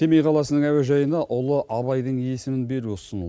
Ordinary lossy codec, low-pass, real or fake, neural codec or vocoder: none; none; real; none